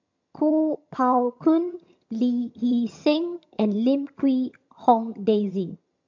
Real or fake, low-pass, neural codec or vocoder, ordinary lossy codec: fake; 7.2 kHz; vocoder, 22.05 kHz, 80 mel bands, HiFi-GAN; MP3, 48 kbps